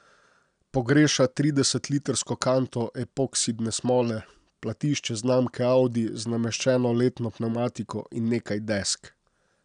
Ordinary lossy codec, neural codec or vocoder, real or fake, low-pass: none; none; real; 9.9 kHz